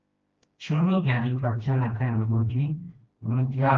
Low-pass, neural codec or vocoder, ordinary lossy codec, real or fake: 7.2 kHz; codec, 16 kHz, 1 kbps, FreqCodec, smaller model; Opus, 24 kbps; fake